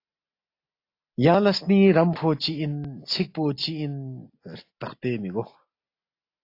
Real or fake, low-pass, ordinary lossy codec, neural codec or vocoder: real; 5.4 kHz; AAC, 32 kbps; none